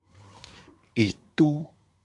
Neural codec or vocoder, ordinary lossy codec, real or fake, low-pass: codec, 24 kHz, 1 kbps, SNAC; MP3, 64 kbps; fake; 10.8 kHz